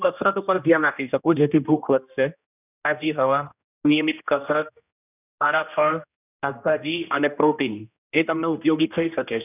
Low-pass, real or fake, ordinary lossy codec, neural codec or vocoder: 3.6 kHz; fake; none; codec, 16 kHz, 1 kbps, X-Codec, HuBERT features, trained on general audio